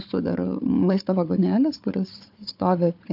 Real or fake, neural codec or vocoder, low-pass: fake; codec, 16 kHz, 4 kbps, FunCodec, trained on Chinese and English, 50 frames a second; 5.4 kHz